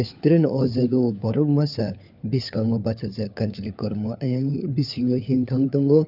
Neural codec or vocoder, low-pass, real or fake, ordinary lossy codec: codec, 16 kHz, 4 kbps, FunCodec, trained on LibriTTS, 50 frames a second; 5.4 kHz; fake; none